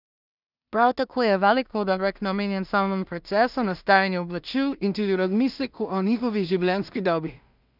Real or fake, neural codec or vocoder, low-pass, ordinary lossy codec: fake; codec, 16 kHz in and 24 kHz out, 0.4 kbps, LongCat-Audio-Codec, two codebook decoder; 5.4 kHz; none